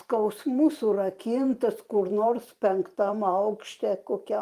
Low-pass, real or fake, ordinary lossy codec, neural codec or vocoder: 14.4 kHz; fake; Opus, 32 kbps; vocoder, 44.1 kHz, 128 mel bands every 512 samples, BigVGAN v2